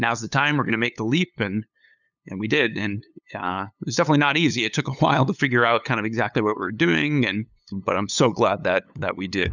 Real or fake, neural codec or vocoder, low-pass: fake; codec, 16 kHz, 8 kbps, FunCodec, trained on LibriTTS, 25 frames a second; 7.2 kHz